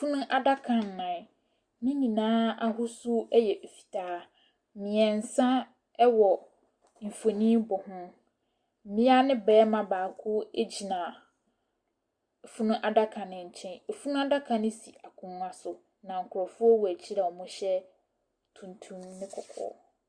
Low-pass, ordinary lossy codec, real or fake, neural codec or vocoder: 9.9 kHz; Opus, 64 kbps; real; none